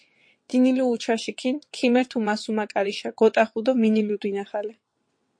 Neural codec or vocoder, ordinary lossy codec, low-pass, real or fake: vocoder, 22.05 kHz, 80 mel bands, WaveNeXt; MP3, 48 kbps; 9.9 kHz; fake